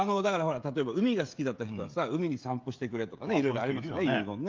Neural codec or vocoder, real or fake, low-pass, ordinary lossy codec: codec, 16 kHz, 16 kbps, FreqCodec, smaller model; fake; 7.2 kHz; Opus, 24 kbps